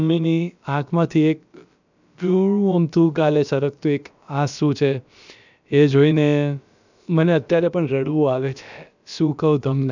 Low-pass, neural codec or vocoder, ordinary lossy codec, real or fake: 7.2 kHz; codec, 16 kHz, about 1 kbps, DyCAST, with the encoder's durations; none; fake